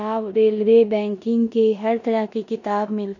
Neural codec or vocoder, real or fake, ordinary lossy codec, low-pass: codec, 16 kHz in and 24 kHz out, 0.9 kbps, LongCat-Audio-Codec, four codebook decoder; fake; none; 7.2 kHz